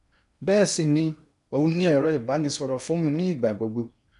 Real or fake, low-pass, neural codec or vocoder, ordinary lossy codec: fake; 10.8 kHz; codec, 16 kHz in and 24 kHz out, 0.8 kbps, FocalCodec, streaming, 65536 codes; none